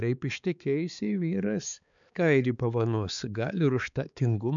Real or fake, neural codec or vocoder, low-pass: fake; codec, 16 kHz, 4 kbps, X-Codec, HuBERT features, trained on balanced general audio; 7.2 kHz